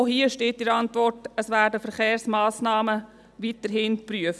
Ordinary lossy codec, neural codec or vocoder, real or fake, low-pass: none; none; real; none